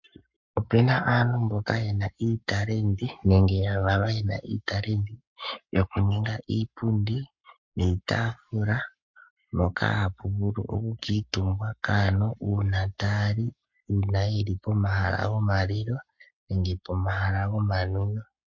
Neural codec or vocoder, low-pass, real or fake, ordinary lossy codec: codec, 44.1 kHz, 7.8 kbps, Pupu-Codec; 7.2 kHz; fake; MP3, 48 kbps